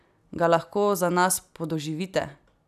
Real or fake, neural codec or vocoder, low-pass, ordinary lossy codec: real; none; 14.4 kHz; none